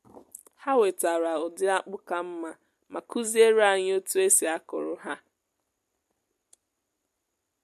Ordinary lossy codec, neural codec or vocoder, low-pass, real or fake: MP3, 64 kbps; none; 14.4 kHz; real